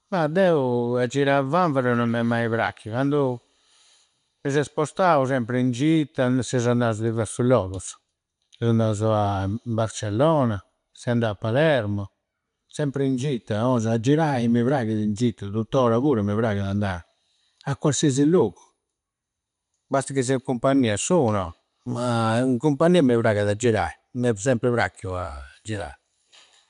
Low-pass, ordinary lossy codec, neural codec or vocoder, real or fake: 10.8 kHz; none; none; real